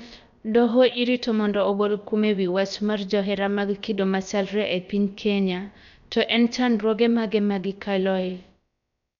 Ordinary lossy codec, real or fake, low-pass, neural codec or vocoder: none; fake; 7.2 kHz; codec, 16 kHz, about 1 kbps, DyCAST, with the encoder's durations